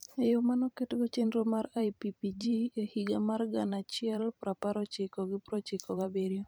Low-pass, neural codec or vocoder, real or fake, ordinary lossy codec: none; vocoder, 44.1 kHz, 128 mel bands every 512 samples, BigVGAN v2; fake; none